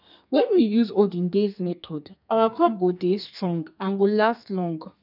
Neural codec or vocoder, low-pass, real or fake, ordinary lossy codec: codec, 32 kHz, 1.9 kbps, SNAC; 5.4 kHz; fake; none